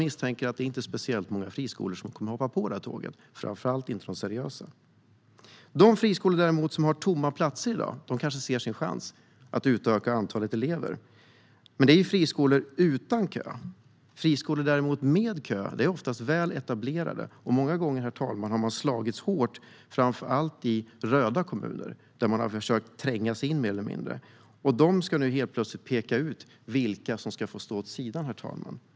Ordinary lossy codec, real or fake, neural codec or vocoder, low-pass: none; real; none; none